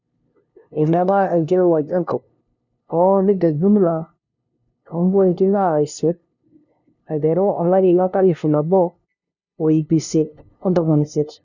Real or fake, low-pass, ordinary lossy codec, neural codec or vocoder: fake; 7.2 kHz; none; codec, 16 kHz, 0.5 kbps, FunCodec, trained on LibriTTS, 25 frames a second